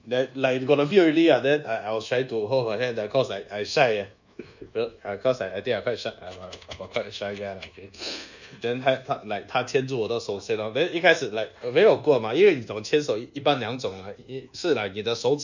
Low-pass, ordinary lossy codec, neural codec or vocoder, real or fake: 7.2 kHz; none; codec, 24 kHz, 1.2 kbps, DualCodec; fake